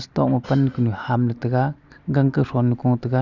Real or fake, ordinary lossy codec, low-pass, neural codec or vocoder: real; none; 7.2 kHz; none